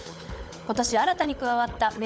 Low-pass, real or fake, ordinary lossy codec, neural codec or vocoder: none; fake; none; codec, 16 kHz, 16 kbps, FunCodec, trained on LibriTTS, 50 frames a second